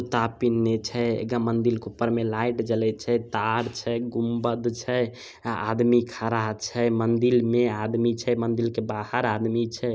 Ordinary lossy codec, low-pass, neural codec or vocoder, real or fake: none; none; none; real